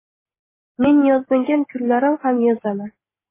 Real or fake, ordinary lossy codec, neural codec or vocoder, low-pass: real; MP3, 16 kbps; none; 3.6 kHz